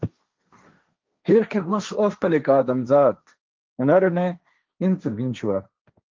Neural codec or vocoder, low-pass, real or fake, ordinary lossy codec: codec, 16 kHz, 1.1 kbps, Voila-Tokenizer; 7.2 kHz; fake; Opus, 24 kbps